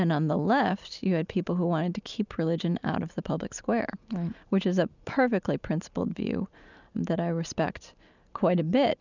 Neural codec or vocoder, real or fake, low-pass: none; real; 7.2 kHz